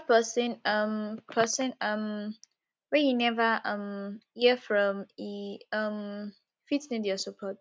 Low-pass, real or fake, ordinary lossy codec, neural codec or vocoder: none; real; none; none